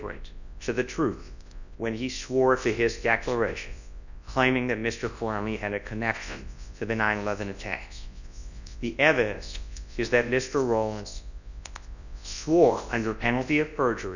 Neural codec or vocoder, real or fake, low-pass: codec, 24 kHz, 0.9 kbps, WavTokenizer, large speech release; fake; 7.2 kHz